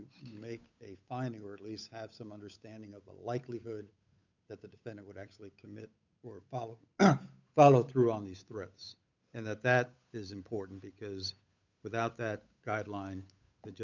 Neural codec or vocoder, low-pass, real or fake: codec, 16 kHz, 8 kbps, FunCodec, trained on Chinese and English, 25 frames a second; 7.2 kHz; fake